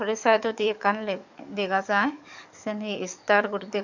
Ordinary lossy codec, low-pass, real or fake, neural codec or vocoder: none; 7.2 kHz; fake; codec, 44.1 kHz, 7.8 kbps, DAC